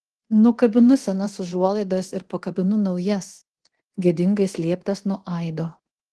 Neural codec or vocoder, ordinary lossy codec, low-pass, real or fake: codec, 24 kHz, 0.9 kbps, DualCodec; Opus, 16 kbps; 10.8 kHz; fake